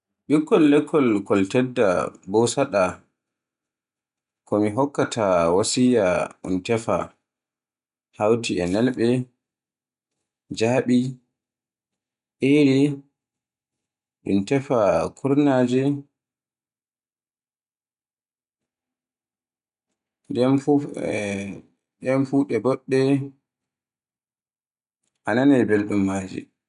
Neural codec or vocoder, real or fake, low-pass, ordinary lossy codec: none; real; 10.8 kHz; none